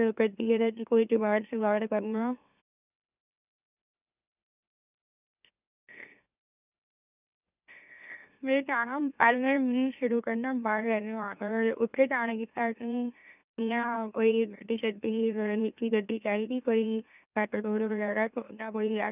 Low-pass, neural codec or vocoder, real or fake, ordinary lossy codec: 3.6 kHz; autoencoder, 44.1 kHz, a latent of 192 numbers a frame, MeloTTS; fake; none